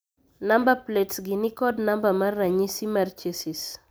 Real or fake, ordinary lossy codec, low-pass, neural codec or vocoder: real; none; none; none